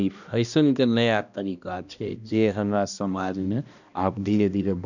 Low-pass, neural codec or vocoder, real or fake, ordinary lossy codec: 7.2 kHz; codec, 16 kHz, 1 kbps, X-Codec, HuBERT features, trained on balanced general audio; fake; none